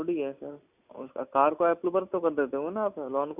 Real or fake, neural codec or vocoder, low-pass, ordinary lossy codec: real; none; 3.6 kHz; none